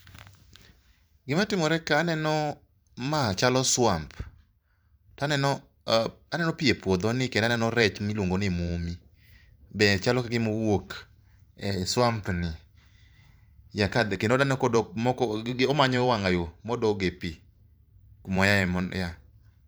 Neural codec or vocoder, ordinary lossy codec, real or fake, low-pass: vocoder, 44.1 kHz, 128 mel bands every 512 samples, BigVGAN v2; none; fake; none